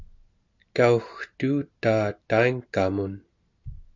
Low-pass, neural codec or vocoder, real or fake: 7.2 kHz; none; real